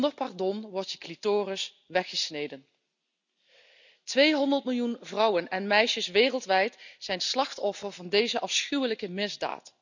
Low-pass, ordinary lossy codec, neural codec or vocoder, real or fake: 7.2 kHz; none; none; real